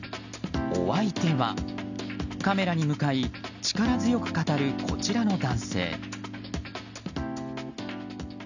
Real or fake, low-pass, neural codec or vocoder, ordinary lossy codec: real; 7.2 kHz; none; none